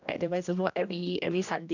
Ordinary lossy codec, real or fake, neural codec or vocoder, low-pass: none; fake; codec, 16 kHz, 1 kbps, X-Codec, HuBERT features, trained on general audio; 7.2 kHz